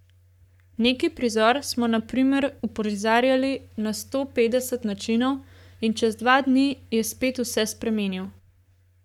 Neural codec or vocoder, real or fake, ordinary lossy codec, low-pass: codec, 44.1 kHz, 7.8 kbps, Pupu-Codec; fake; none; 19.8 kHz